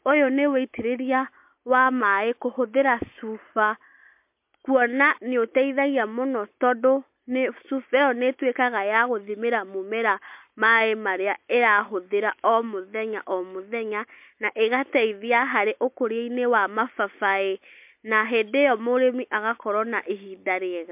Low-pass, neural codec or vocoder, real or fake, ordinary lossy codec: 3.6 kHz; none; real; MP3, 32 kbps